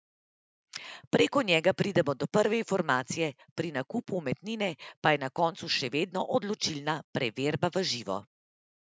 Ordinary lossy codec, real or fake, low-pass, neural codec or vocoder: none; real; none; none